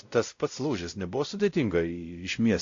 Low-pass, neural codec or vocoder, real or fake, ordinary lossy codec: 7.2 kHz; codec, 16 kHz, 0.5 kbps, X-Codec, WavLM features, trained on Multilingual LibriSpeech; fake; AAC, 48 kbps